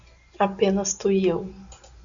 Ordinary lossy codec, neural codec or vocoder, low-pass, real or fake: Opus, 64 kbps; none; 7.2 kHz; real